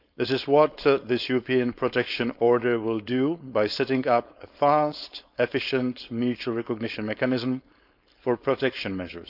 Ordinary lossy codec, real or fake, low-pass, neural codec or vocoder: none; fake; 5.4 kHz; codec, 16 kHz, 4.8 kbps, FACodec